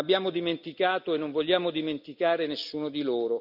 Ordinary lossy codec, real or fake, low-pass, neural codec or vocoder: none; real; 5.4 kHz; none